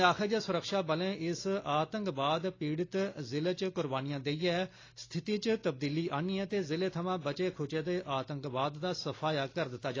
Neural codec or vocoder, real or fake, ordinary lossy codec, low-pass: none; real; AAC, 32 kbps; 7.2 kHz